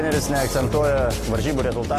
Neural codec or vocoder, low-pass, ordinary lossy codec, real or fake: none; 14.4 kHz; AAC, 48 kbps; real